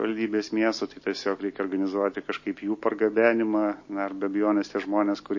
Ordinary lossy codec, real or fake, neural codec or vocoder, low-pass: MP3, 32 kbps; real; none; 7.2 kHz